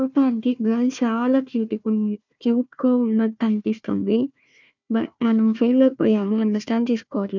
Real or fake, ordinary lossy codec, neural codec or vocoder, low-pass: fake; none; codec, 16 kHz, 1 kbps, FunCodec, trained on Chinese and English, 50 frames a second; 7.2 kHz